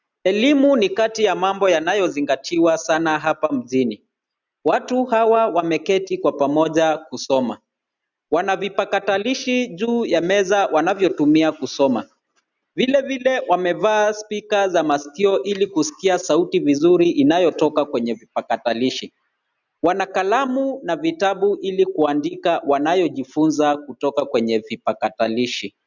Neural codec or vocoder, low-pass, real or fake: none; 7.2 kHz; real